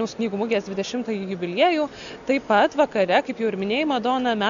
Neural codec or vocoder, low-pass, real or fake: none; 7.2 kHz; real